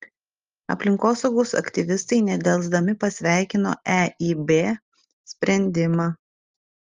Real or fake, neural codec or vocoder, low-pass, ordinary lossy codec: real; none; 7.2 kHz; Opus, 32 kbps